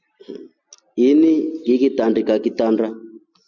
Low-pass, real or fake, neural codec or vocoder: 7.2 kHz; real; none